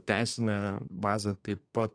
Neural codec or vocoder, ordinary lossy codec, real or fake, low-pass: codec, 24 kHz, 1 kbps, SNAC; AAC, 48 kbps; fake; 9.9 kHz